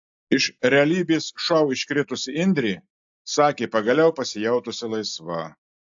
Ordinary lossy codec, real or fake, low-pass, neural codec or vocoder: AAC, 64 kbps; real; 7.2 kHz; none